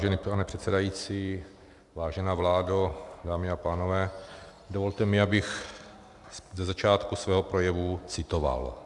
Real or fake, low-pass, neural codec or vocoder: real; 10.8 kHz; none